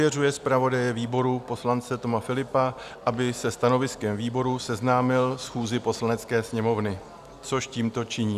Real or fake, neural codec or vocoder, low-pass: real; none; 14.4 kHz